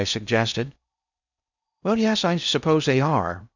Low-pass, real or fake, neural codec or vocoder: 7.2 kHz; fake; codec, 16 kHz in and 24 kHz out, 0.6 kbps, FocalCodec, streaming, 4096 codes